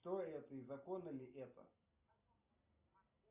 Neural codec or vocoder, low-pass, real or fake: none; 3.6 kHz; real